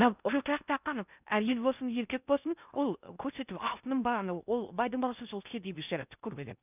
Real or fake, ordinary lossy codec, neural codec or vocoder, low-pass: fake; none; codec, 16 kHz in and 24 kHz out, 0.6 kbps, FocalCodec, streaming, 2048 codes; 3.6 kHz